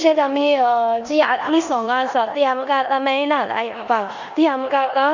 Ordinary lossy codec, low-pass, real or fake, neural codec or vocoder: none; 7.2 kHz; fake; codec, 16 kHz in and 24 kHz out, 0.9 kbps, LongCat-Audio-Codec, four codebook decoder